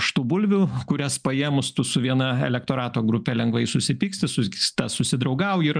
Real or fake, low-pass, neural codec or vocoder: real; 9.9 kHz; none